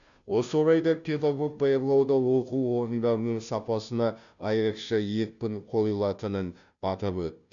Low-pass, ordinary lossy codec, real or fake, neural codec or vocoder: 7.2 kHz; none; fake; codec, 16 kHz, 0.5 kbps, FunCodec, trained on Chinese and English, 25 frames a second